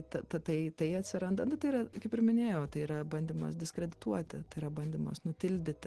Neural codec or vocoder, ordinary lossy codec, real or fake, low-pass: none; Opus, 16 kbps; real; 10.8 kHz